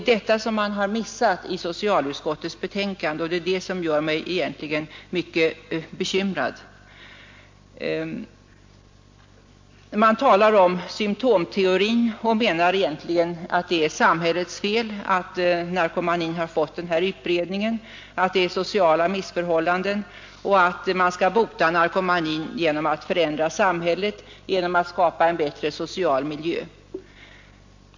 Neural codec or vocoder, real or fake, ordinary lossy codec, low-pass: none; real; MP3, 48 kbps; 7.2 kHz